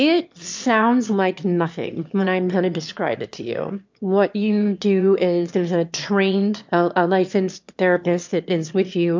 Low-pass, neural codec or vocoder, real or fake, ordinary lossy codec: 7.2 kHz; autoencoder, 22.05 kHz, a latent of 192 numbers a frame, VITS, trained on one speaker; fake; MP3, 64 kbps